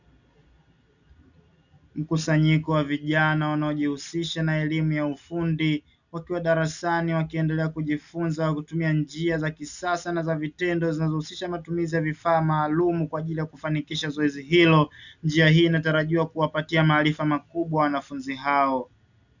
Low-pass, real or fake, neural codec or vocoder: 7.2 kHz; real; none